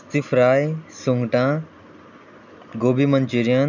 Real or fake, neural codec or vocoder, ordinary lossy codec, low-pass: real; none; none; 7.2 kHz